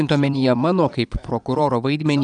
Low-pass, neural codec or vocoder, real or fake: 9.9 kHz; vocoder, 22.05 kHz, 80 mel bands, Vocos; fake